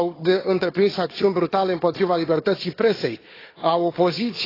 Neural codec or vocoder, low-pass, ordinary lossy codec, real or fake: codec, 16 kHz, 4 kbps, FunCodec, trained on Chinese and English, 50 frames a second; 5.4 kHz; AAC, 24 kbps; fake